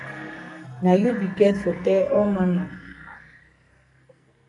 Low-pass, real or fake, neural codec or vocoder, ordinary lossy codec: 10.8 kHz; fake; codec, 44.1 kHz, 2.6 kbps, SNAC; AAC, 64 kbps